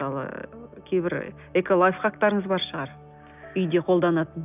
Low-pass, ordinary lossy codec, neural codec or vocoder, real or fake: 3.6 kHz; none; none; real